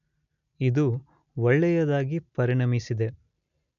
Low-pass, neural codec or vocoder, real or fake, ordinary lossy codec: 7.2 kHz; none; real; none